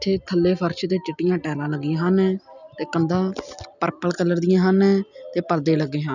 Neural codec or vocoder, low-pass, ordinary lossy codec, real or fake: none; 7.2 kHz; none; real